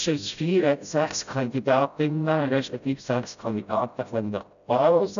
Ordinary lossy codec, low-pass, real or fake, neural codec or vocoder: none; 7.2 kHz; fake; codec, 16 kHz, 0.5 kbps, FreqCodec, smaller model